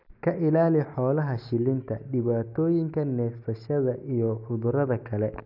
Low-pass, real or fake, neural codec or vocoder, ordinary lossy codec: 5.4 kHz; real; none; none